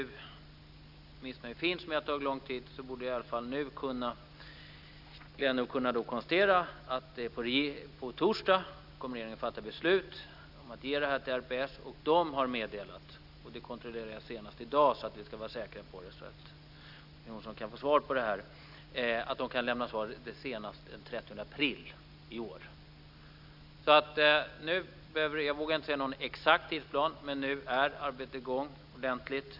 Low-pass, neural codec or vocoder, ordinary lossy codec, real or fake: 5.4 kHz; none; none; real